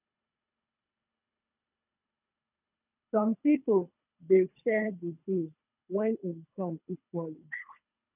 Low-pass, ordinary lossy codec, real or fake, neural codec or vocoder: 3.6 kHz; none; fake; codec, 24 kHz, 3 kbps, HILCodec